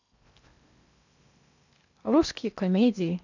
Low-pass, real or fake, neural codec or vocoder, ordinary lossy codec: 7.2 kHz; fake; codec, 16 kHz in and 24 kHz out, 0.8 kbps, FocalCodec, streaming, 65536 codes; none